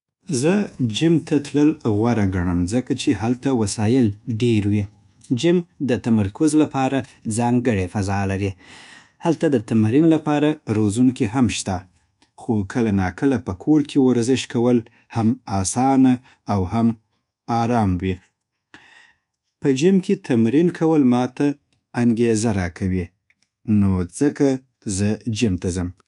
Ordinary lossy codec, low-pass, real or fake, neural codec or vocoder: none; 10.8 kHz; fake; codec, 24 kHz, 1.2 kbps, DualCodec